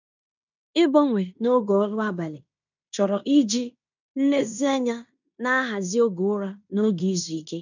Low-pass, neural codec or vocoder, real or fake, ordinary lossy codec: 7.2 kHz; codec, 16 kHz in and 24 kHz out, 0.9 kbps, LongCat-Audio-Codec, fine tuned four codebook decoder; fake; none